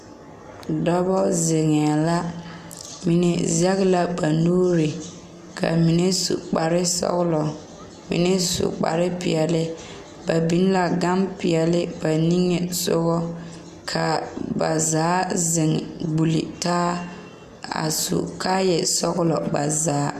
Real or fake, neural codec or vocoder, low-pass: real; none; 14.4 kHz